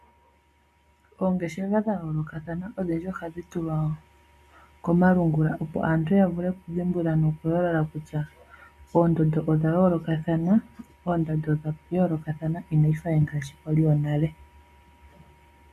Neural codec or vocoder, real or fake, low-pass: none; real; 14.4 kHz